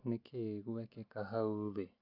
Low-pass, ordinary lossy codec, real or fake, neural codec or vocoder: 5.4 kHz; AAC, 32 kbps; real; none